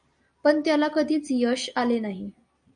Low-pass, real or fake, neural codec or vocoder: 9.9 kHz; real; none